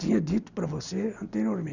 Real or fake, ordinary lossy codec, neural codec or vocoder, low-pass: real; none; none; 7.2 kHz